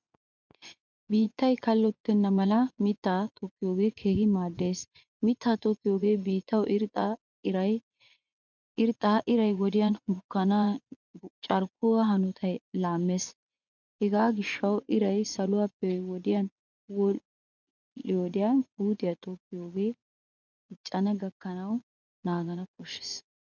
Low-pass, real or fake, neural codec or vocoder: 7.2 kHz; fake; vocoder, 24 kHz, 100 mel bands, Vocos